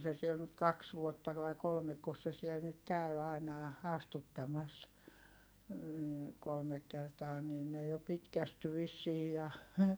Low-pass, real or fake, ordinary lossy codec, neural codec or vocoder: none; fake; none; codec, 44.1 kHz, 2.6 kbps, SNAC